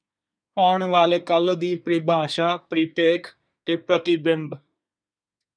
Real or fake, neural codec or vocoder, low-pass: fake; codec, 24 kHz, 1 kbps, SNAC; 9.9 kHz